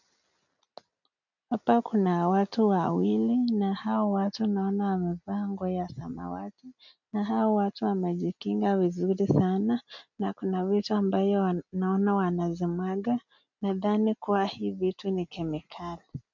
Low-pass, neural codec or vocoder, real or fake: 7.2 kHz; none; real